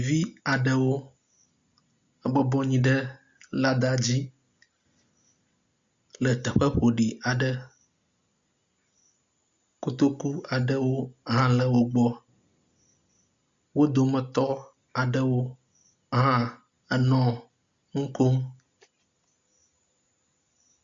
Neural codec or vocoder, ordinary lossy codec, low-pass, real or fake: none; Opus, 64 kbps; 7.2 kHz; real